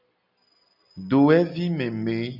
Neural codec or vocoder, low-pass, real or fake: none; 5.4 kHz; real